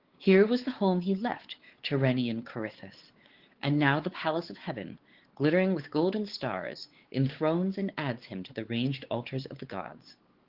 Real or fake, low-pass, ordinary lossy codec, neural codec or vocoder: fake; 5.4 kHz; Opus, 16 kbps; codec, 16 kHz, 4 kbps, X-Codec, WavLM features, trained on Multilingual LibriSpeech